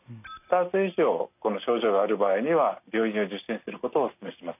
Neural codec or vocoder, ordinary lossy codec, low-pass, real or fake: none; none; 3.6 kHz; real